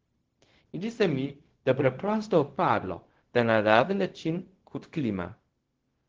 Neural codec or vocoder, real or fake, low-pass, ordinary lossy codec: codec, 16 kHz, 0.4 kbps, LongCat-Audio-Codec; fake; 7.2 kHz; Opus, 16 kbps